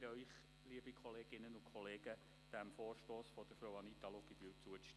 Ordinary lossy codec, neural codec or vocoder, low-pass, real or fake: none; none; none; real